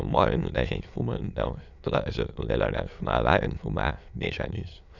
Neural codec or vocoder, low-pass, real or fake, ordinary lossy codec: autoencoder, 22.05 kHz, a latent of 192 numbers a frame, VITS, trained on many speakers; 7.2 kHz; fake; none